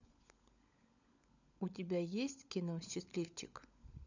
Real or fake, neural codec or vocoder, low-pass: fake; codec, 16 kHz, 16 kbps, FunCodec, trained on LibriTTS, 50 frames a second; 7.2 kHz